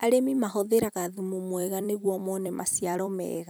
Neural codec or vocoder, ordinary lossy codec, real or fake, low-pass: vocoder, 44.1 kHz, 128 mel bands every 256 samples, BigVGAN v2; none; fake; none